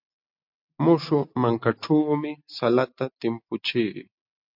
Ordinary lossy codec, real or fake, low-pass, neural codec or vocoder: MP3, 48 kbps; real; 5.4 kHz; none